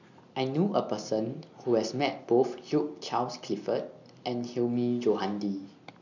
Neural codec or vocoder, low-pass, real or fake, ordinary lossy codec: none; 7.2 kHz; real; none